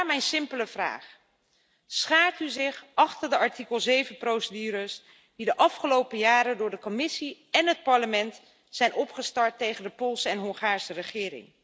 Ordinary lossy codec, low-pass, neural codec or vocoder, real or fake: none; none; none; real